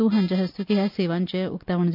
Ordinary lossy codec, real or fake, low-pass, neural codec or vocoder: none; real; 5.4 kHz; none